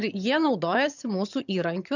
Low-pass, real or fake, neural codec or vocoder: 7.2 kHz; fake; vocoder, 22.05 kHz, 80 mel bands, HiFi-GAN